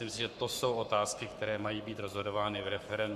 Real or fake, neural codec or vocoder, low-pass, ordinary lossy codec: fake; codec, 44.1 kHz, 7.8 kbps, Pupu-Codec; 14.4 kHz; MP3, 96 kbps